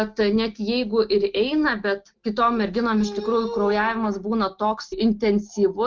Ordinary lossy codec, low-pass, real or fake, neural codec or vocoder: Opus, 32 kbps; 7.2 kHz; real; none